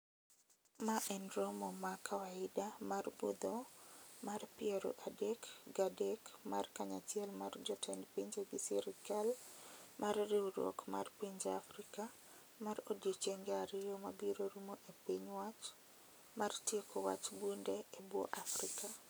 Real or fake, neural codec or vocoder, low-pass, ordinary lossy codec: real; none; none; none